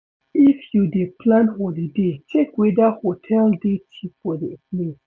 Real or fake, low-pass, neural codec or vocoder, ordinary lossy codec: real; none; none; none